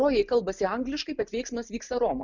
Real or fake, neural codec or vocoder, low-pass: real; none; 7.2 kHz